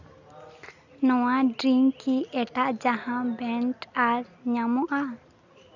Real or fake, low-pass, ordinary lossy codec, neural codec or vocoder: real; 7.2 kHz; none; none